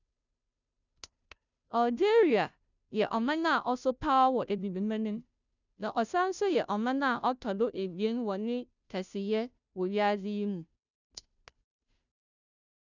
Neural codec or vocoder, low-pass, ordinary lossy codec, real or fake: codec, 16 kHz, 0.5 kbps, FunCodec, trained on Chinese and English, 25 frames a second; 7.2 kHz; none; fake